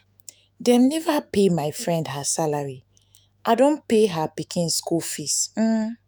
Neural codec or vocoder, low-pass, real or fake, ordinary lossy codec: autoencoder, 48 kHz, 128 numbers a frame, DAC-VAE, trained on Japanese speech; none; fake; none